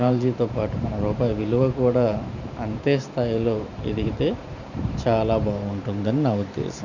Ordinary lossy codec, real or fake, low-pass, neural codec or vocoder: none; fake; 7.2 kHz; vocoder, 44.1 kHz, 128 mel bands every 512 samples, BigVGAN v2